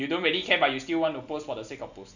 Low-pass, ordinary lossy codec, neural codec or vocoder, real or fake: 7.2 kHz; none; none; real